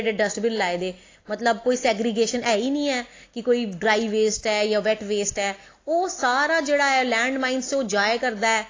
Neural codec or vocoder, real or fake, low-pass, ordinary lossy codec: none; real; 7.2 kHz; AAC, 32 kbps